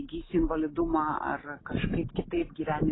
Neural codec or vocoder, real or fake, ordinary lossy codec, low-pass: none; real; AAC, 16 kbps; 7.2 kHz